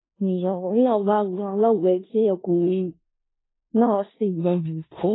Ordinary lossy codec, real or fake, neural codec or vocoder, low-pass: AAC, 16 kbps; fake; codec, 16 kHz in and 24 kHz out, 0.4 kbps, LongCat-Audio-Codec, four codebook decoder; 7.2 kHz